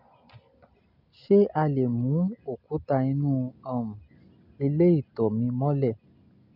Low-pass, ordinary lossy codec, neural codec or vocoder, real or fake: 5.4 kHz; none; none; real